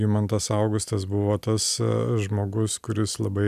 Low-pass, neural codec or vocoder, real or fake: 14.4 kHz; none; real